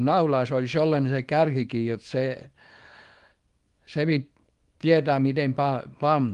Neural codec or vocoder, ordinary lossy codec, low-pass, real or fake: codec, 24 kHz, 0.9 kbps, WavTokenizer, small release; Opus, 24 kbps; 10.8 kHz; fake